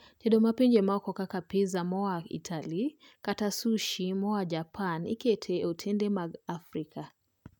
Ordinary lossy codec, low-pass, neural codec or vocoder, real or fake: none; 19.8 kHz; none; real